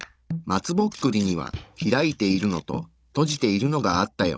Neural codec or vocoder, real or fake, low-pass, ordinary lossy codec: codec, 16 kHz, 16 kbps, FunCodec, trained on Chinese and English, 50 frames a second; fake; none; none